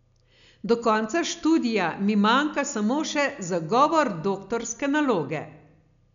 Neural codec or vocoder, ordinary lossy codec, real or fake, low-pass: none; none; real; 7.2 kHz